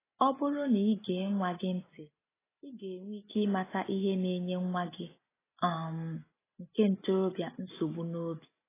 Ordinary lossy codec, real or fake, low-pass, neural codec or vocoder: AAC, 16 kbps; real; 3.6 kHz; none